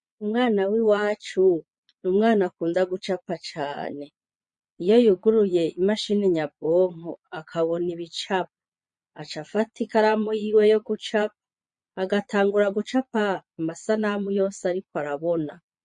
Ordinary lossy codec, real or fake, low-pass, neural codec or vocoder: MP3, 48 kbps; fake; 9.9 kHz; vocoder, 22.05 kHz, 80 mel bands, Vocos